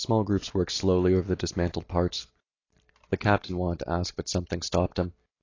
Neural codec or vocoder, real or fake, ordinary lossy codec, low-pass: none; real; AAC, 32 kbps; 7.2 kHz